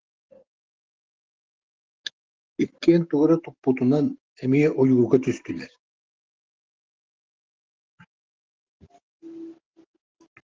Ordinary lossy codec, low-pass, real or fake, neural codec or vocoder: Opus, 16 kbps; 7.2 kHz; real; none